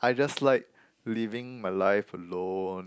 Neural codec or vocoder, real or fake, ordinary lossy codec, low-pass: none; real; none; none